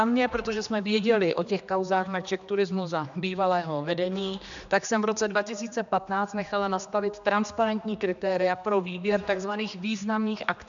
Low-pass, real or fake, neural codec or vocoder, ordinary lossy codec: 7.2 kHz; fake; codec, 16 kHz, 2 kbps, X-Codec, HuBERT features, trained on general audio; MP3, 96 kbps